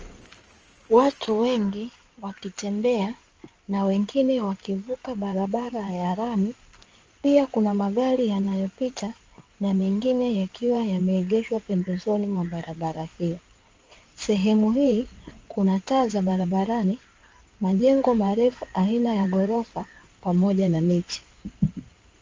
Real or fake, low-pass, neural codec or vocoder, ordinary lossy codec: fake; 7.2 kHz; codec, 16 kHz in and 24 kHz out, 2.2 kbps, FireRedTTS-2 codec; Opus, 24 kbps